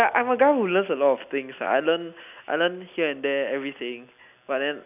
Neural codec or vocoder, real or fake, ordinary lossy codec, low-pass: none; real; none; 3.6 kHz